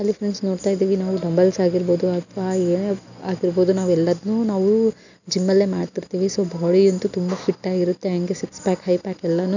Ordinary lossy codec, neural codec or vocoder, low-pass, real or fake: none; none; 7.2 kHz; real